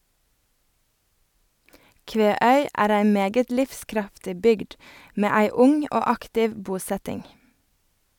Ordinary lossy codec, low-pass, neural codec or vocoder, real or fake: none; 19.8 kHz; none; real